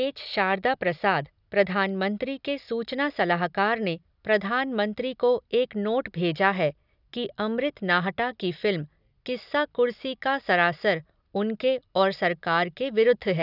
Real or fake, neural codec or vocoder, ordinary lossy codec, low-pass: real; none; none; 5.4 kHz